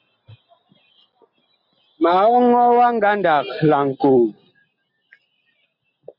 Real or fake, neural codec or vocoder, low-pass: real; none; 5.4 kHz